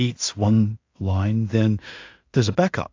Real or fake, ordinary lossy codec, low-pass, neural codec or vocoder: fake; AAC, 48 kbps; 7.2 kHz; codec, 16 kHz in and 24 kHz out, 0.4 kbps, LongCat-Audio-Codec, two codebook decoder